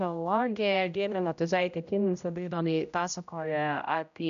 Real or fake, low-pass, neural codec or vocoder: fake; 7.2 kHz; codec, 16 kHz, 0.5 kbps, X-Codec, HuBERT features, trained on general audio